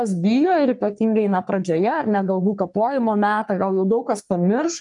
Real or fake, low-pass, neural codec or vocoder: fake; 10.8 kHz; codec, 44.1 kHz, 3.4 kbps, Pupu-Codec